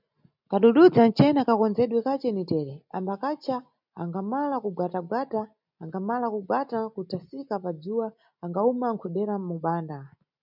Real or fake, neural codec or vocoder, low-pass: real; none; 5.4 kHz